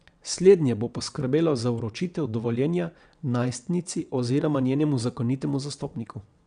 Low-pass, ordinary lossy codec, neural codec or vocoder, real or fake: 9.9 kHz; none; vocoder, 22.05 kHz, 80 mel bands, WaveNeXt; fake